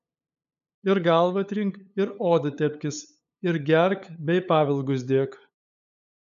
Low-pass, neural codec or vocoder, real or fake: 7.2 kHz; codec, 16 kHz, 8 kbps, FunCodec, trained on LibriTTS, 25 frames a second; fake